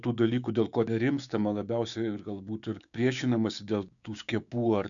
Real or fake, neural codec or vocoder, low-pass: fake; codec, 16 kHz, 6 kbps, DAC; 7.2 kHz